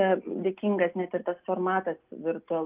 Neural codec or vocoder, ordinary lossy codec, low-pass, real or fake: none; Opus, 32 kbps; 3.6 kHz; real